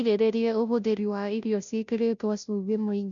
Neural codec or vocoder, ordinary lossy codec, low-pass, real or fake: codec, 16 kHz, 0.5 kbps, FunCodec, trained on Chinese and English, 25 frames a second; none; 7.2 kHz; fake